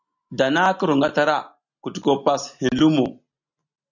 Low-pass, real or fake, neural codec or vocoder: 7.2 kHz; real; none